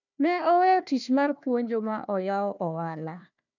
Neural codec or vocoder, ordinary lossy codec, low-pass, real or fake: codec, 16 kHz, 1 kbps, FunCodec, trained on Chinese and English, 50 frames a second; none; 7.2 kHz; fake